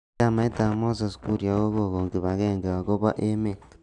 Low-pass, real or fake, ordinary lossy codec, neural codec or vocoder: 10.8 kHz; real; none; none